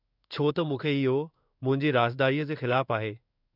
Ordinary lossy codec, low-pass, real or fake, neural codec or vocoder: none; 5.4 kHz; fake; codec, 16 kHz in and 24 kHz out, 1 kbps, XY-Tokenizer